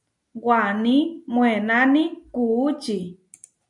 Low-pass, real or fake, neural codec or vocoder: 10.8 kHz; real; none